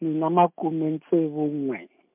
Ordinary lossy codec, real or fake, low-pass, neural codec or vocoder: MP3, 32 kbps; real; 3.6 kHz; none